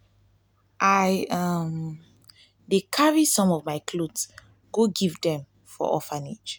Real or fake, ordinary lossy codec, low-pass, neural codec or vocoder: real; none; none; none